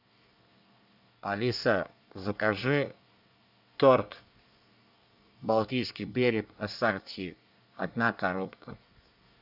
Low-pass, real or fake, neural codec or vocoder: 5.4 kHz; fake; codec, 24 kHz, 1 kbps, SNAC